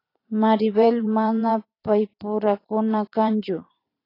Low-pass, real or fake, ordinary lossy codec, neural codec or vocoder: 5.4 kHz; fake; AAC, 24 kbps; vocoder, 44.1 kHz, 128 mel bands every 512 samples, BigVGAN v2